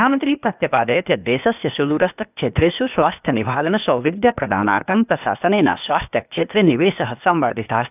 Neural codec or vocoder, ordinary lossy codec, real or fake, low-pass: codec, 16 kHz, 0.8 kbps, ZipCodec; none; fake; 3.6 kHz